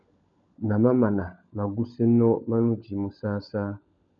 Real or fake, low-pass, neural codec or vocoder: fake; 7.2 kHz; codec, 16 kHz, 16 kbps, FunCodec, trained on LibriTTS, 50 frames a second